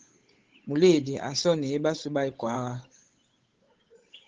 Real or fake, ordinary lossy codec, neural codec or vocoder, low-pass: fake; Opus, 16 kbps; codec, 16 kHz, 8 kbps, FunCodec, trained on Chinese and English, 25 frames a second; 7.2 kHz